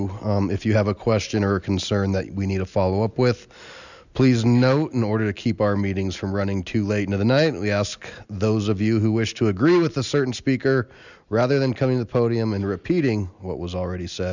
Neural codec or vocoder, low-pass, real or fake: none; 7.2 kHz; real